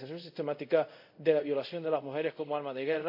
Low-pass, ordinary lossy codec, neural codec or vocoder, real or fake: 5.4 kHz; AAC, 48 kbps; codec, 24 kHz, 0.5 kbps, DualCodec; fake